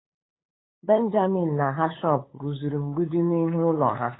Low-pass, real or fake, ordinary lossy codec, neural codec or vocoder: 7.2 kHz; fake; AAC, 16 kbps; codec, 16 kHz, 8 kbps, FunCodec, trained on LibriTTS, 25 frames a second